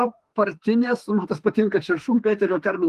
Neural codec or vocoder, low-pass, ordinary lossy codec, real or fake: autoencoder, 48 kHz, 32 numbers a frame, DAC-VAE, trained on Japanese speech; 14.4 kHz; Opus, 24 kbps; fake